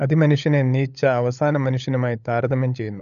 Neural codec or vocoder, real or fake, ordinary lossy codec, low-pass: codec, 16 kHz, 16 kbps, FunCodec, trained on LibriTTS, 50 frames a second; fake; none; 7.2 kHz